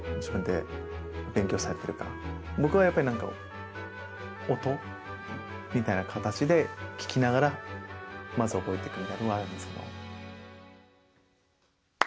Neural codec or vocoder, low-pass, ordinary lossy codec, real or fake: none; none; none; real